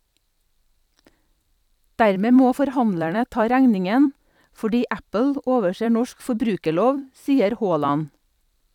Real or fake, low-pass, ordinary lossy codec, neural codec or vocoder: fake; 19.8 kHz; none; vocoder, 44.1 kHz, 128 mel bands every 256 samples, BigVGAN v2